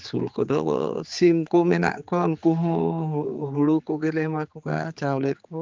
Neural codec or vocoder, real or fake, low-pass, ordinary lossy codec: codec, 16 kHz, 4 kbps, X-Codec, HuBERT features, trained on general audio; fake; 7.2 kHz; Opus, 32 kbps